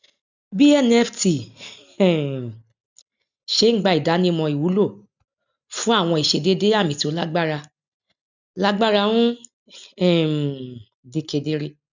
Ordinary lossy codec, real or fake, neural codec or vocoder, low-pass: none; real; none; 7.2 kHz